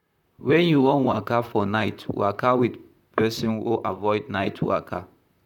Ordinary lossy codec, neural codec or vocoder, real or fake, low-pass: none; vocoder, 44.1 kHz, 128 mel bands, Pupu-Vocoder; fake; 19.8 kHz